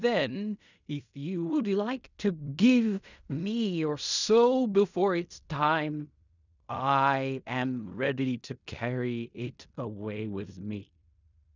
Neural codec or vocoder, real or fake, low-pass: codec, 16 kHz in and 24 kHz out, 0.4 kbps, LongCat-Audio-Codec, fine tuned four codebook decoder; fake; 7.2 kHz